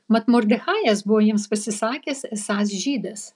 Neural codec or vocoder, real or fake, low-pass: none; real; 10.8 kHz